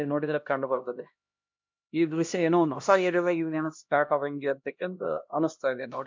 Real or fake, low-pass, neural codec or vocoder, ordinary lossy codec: fake; 7.2 kHz; codec, 16 kHz, 1 kbps, X-Codec, HuBERT features, trained on LibriSpeech; MP3, 48 kbps